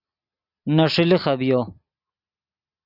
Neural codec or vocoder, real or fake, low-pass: none; real; 5.4 kHz